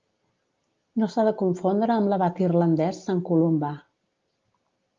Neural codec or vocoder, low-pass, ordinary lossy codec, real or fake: none; 7.2 kHz; Opus, 32 kbps; real